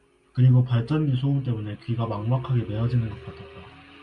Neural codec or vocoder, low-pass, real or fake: none; 10.8 kHz; real